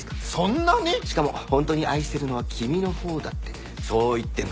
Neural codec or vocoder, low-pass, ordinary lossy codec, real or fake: none; none; none; real